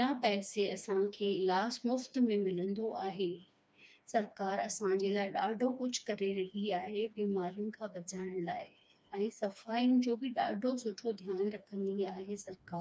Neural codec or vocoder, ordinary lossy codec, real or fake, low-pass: codec, 16 kHz, 2 kbps, FreqCodec, smaller model; none; fake; none